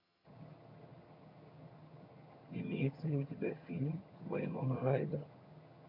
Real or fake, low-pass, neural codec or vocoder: fake; 5.4 kHz; vocoder, 22.05 kHz, 80 mel bands, HiFi-GAN